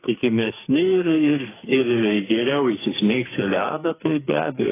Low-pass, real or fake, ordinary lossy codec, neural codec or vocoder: 3.6 kHz; fake; AAC, 16 kbps; codec, 44.1 kHz, 2.6 kbps, SNAC